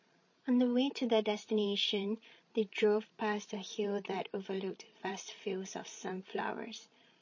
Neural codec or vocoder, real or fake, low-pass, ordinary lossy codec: codec, 16 kHz, 16 kbps, FreqCodec, larger model; fake; 7.2 kHz; MP3, 32 kbps